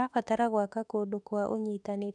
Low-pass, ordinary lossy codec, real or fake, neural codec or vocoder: 10.8 kHz; none; fake; autoencoder, 48 kHz, 32 numbers a frame, DAC-VAE, trained on Japanese speech